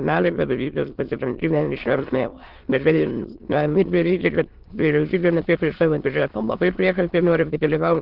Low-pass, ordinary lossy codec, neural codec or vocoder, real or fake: 5.4 kHz; Opus, 16 kbps; autoencoder, 22.05 kHz, a latent of 192 numbers a frame, VITS, trained on many speakers; fake